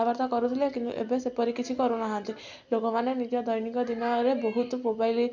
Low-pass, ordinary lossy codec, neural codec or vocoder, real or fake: 7.2 kHz; none; none; real